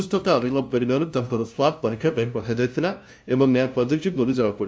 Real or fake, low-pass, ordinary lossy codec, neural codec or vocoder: fake; none; none; codec, 16 kHz, 0.5 kbps, FunCodec, trained on LibriTTS, 25 frames a second